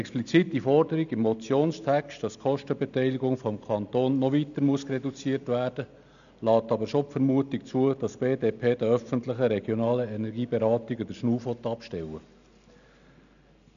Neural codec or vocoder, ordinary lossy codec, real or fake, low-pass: none; none; real; 7.2 kHz